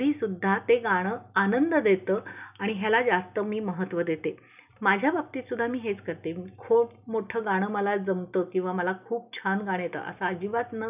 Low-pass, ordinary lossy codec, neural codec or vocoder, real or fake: 3.6 kHz; none; none; real